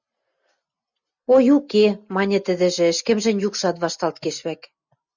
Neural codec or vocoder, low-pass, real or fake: none; 7.2 kHz; real